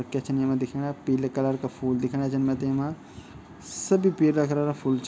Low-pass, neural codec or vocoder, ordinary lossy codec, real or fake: none; none; none; real